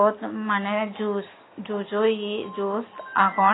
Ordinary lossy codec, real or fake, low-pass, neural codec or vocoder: AAC, 16 kbps; real; 7.2 kHz; none